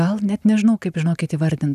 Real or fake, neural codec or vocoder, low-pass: real; none; 14.4 kHz